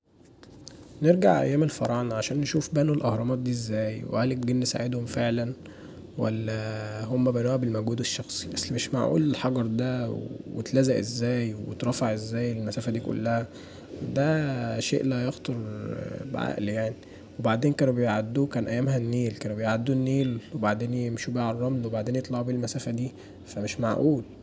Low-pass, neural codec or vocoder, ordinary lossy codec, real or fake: none; none; none; real